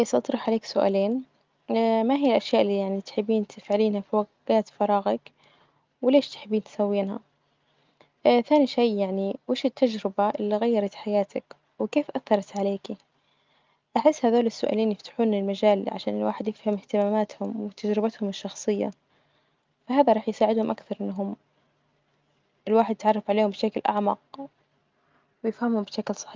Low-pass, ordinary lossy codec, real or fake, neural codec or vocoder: 7.2 kHz; Opus, 32 kbps; real; none